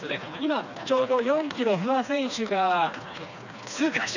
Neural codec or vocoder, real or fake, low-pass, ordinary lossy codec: codec, 16 kHz, 2 kbps, FreqCodec, smaller model; fake; 7.2 kHz; none